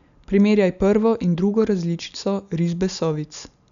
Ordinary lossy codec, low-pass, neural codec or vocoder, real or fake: none; 7.2 kHz; none; real